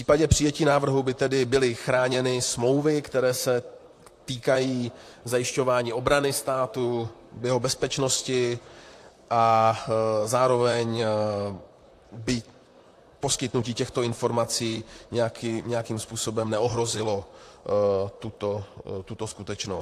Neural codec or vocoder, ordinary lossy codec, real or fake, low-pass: vocoder, 44.1 kHz, 128 mel bands, Pupu-Vocoder; AAC, 64 kbps; fake; 14.4 kHz